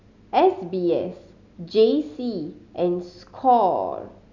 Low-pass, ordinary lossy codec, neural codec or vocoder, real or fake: 7.2 kHz; none; none; real